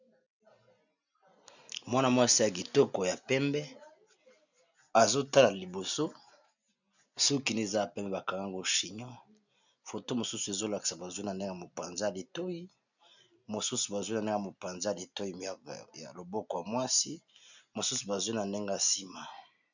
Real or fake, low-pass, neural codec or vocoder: real; 7.2 kHz; none